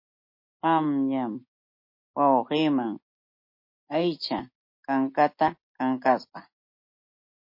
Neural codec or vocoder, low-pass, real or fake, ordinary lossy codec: none; 5.4 kHz; real; MP3, 32 kbps